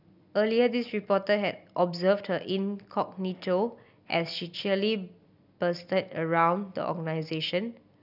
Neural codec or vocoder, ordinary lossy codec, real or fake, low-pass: none; none; real; 5.4 kHz